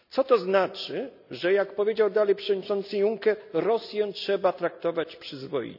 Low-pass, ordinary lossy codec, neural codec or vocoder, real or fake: 5.4 kHz; none; none; real